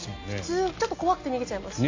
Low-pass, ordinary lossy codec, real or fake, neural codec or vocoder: 7.2 kHz; AAC, 48 kbps; real; none